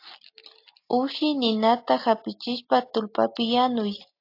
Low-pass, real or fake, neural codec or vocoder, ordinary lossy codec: 5.4 kHz; real; none; AAC, 32 kbps